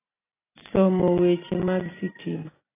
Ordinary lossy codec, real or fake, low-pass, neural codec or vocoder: AAC, 16 kbps; real; 3.6 kHz; none